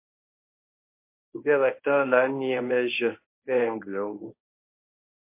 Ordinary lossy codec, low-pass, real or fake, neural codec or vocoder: MP3, 24 kbps; 3.6 kHz; fake; codec, 24 kHz, 0.9 kbps, WavTokenizer, medium speech release version 1